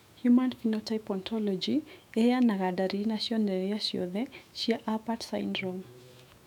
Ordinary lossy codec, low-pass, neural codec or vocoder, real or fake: none; 19.8 kHz; autoencoder, 48 kHz, 128 numbers a frame, DAC-VAE, trained on Japanese speech; fake